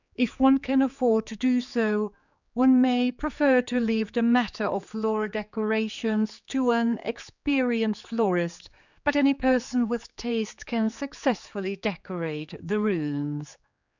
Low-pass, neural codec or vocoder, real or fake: 7.2 kHz; codec, 16 kHz, 4 kbps, X-Codec, HuBERT features, trained on general audio; fake